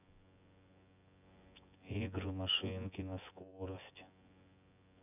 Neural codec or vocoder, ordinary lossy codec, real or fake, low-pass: vocoder, 24 kHz, 100 mel bands, Vocos; none; fake; 3.6 kHz